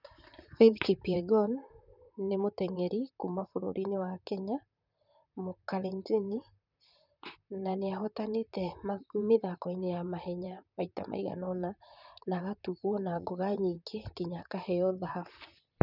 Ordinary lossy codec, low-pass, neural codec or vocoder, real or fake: none; 5.4 kHz; vocoder, 44.1 kHz, 128 mel bands, Pupu-Vocoder; fake